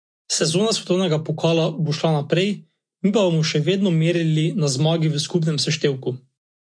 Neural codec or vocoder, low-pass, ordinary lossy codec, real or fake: none; 9.9 kHz; MP3, 48 kbps; real